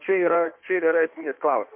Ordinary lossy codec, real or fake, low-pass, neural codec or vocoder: MP3, 32 kbps; fake; 3.6 kHz; codec, 16 kHz in and 24 kHz out, 1.1 kbps, FireRedTTS-2 codec